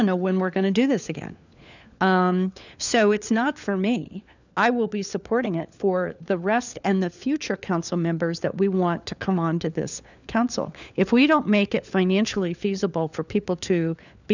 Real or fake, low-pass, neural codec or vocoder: fake; 7.2 kHz; codec, 16 kHz, 4 kbps, FunCodec, trained on LibriTTS, 50 frames a second